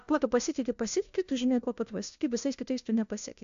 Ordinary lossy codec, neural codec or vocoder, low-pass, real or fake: AAC, 64 kbps; codec, 16 kHz, 1 kbps, FunCodec, trained on LibriTTS, 50 frames a second; 7.2 kHz; fake